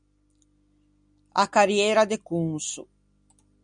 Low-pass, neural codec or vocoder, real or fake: 9.9 kHz; none; real